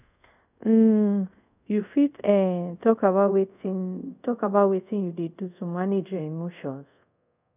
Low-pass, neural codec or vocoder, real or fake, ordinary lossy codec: 3.6 kHz; codec, 24 kHz, 0.5 kbps, DualCodec; fake; none